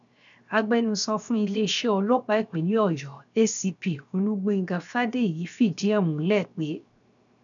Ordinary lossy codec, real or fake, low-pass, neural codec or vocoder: none; fake; 7.2 kHz; codec, 16 kHz, 0.7 kbps, FocalCodec